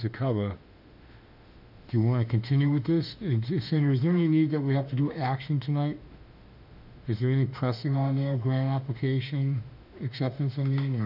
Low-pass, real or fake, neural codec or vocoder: 5.4 kHz; fake; autoencoder, 48 kHz, 32 numbers a frame, DAC-VAE, trained on Japanese speech